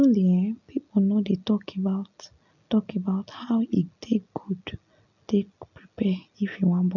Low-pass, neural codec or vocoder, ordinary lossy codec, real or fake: 7.2 kHz; none; none; real